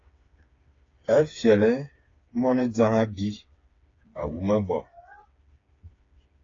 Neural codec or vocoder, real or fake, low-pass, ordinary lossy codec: codec, 16 kHz, 4 kbps, FreqCodec, smaller model; fake; 7.2 kHz; AAC, 32 kbps